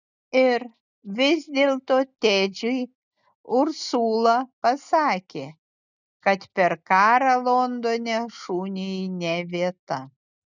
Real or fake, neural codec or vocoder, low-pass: real; none; 7.2 kHz